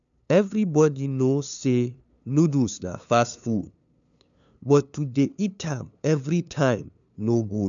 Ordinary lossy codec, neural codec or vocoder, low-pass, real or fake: none; codec, 16 kHz, 2 kbps, FunCodec, trained on LibriTTS, 25 frames a second; 7.2 kHz; fake